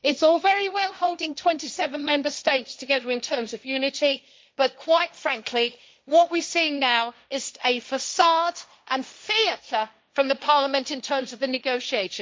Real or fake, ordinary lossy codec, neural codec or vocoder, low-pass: fake; none; codec, 16 kHz, 1.1 kbps, Voila-Tokenizer; none